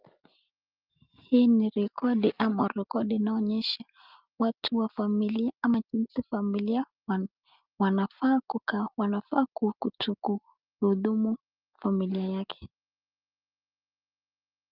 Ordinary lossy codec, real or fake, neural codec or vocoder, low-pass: Opus, 24 kbps; real; none; 5.4 kHz